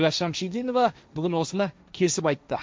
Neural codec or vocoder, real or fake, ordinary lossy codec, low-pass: codec, 16 kHz, 1.1 kbps, Voila-Tokenizer; fake; none; none